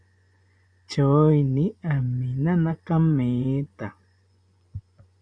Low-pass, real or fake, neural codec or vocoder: 9.9 kHz; fake; vocoder, 24 kHz, 100 mel bands, Vocos